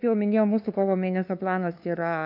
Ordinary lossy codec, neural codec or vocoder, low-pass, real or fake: AAC, 48 kbps; codec, 16 kHz, 2 kbps, FunCodec, trained on LibriTTS, 25 frames a second; 5.4 kHz; fake